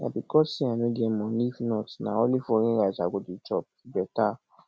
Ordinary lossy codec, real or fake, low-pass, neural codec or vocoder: none; real; none; none